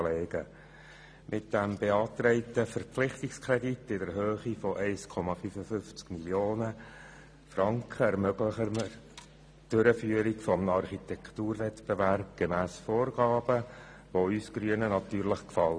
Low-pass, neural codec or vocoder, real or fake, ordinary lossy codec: none; none; real; none